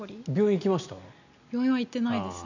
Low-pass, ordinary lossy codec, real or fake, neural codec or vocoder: 7.2 kHz; none; real; none